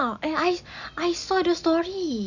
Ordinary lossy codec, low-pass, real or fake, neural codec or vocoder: none; 7.2 kHz; real; none